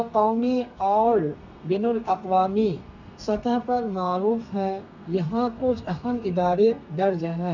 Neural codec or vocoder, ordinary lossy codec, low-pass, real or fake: codec, 32 kHz, 1.9 kbps, SNAC; none; 7.2 kHz; fake